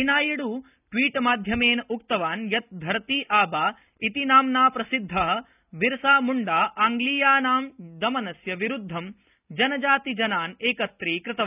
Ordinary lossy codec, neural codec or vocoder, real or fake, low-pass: none; none; real; 3.6 kHz